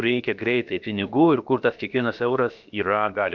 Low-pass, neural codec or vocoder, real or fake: 7.2 kHz; codec, 16 kHz, about 1 kbps, DyCAST, with the encoder's durations; fake